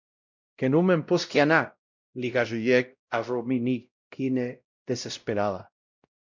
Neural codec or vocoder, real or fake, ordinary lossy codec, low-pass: codec, 16 kHz, 0.5 kbps, X-Codec, WavLM features, trained on Multilingual LibriSpeech; fake; MP3, 64 kbps; 7.2 kHz